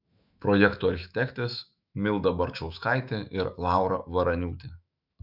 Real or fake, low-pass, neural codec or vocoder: fake; 5.4 kHz; autoencoder, 48 kHz, 128 numbers a frame, DAC-VAE, trained on Japanese speech